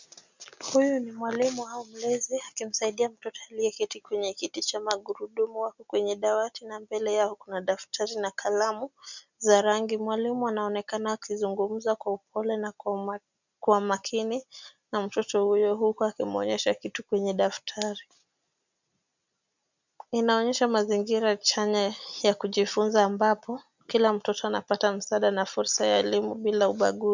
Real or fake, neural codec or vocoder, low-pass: real; none; 7.2 kHz